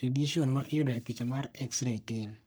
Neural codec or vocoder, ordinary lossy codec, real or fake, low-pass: codec, 44.1 kHz, 3.4 kbps, Pupu-Codec; none; fake; none